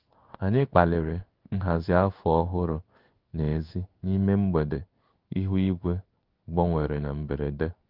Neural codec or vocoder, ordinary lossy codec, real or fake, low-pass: codec, 16 kHz in and 24 kHz out, 1 kbps, XY-Tokenizer; Opus, 24 kbps; fake; 5.4 kHz